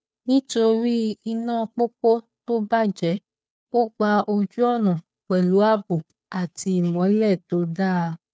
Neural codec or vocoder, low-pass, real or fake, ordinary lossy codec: codec, 16 kHz, 2 kbps, FunCodec, trained on Chinese and English, 25 frames a second; none; fake; none